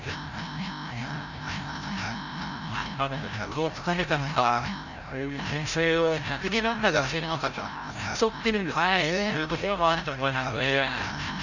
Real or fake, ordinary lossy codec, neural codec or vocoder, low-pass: fake; none; codec, 16 kHz, 0.5 kbps, FreqCodec, larger model; 7.2 kHz